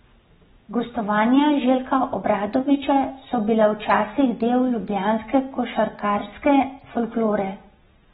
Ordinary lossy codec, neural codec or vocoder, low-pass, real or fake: AAC, 16 kbps; none; 19.8 kHz; real